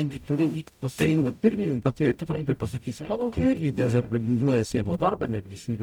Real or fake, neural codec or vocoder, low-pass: fake; codec, 44.1 kHz, 0.9 kbps, DAC; 19.8 kHz